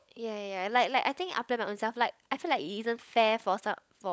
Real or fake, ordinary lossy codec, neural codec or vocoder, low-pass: fake; none; codec, 16 kHz, 16 kbps, FunCodec, trained on LibriTTS, 50 frames a second; none